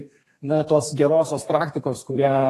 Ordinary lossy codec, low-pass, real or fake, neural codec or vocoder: AAC, 48 kbps; 14.4 kHz; fake; codec, 32 kHz, 1.9 kbps, SNAC